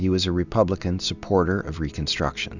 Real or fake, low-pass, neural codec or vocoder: real; 7.2 kHz; none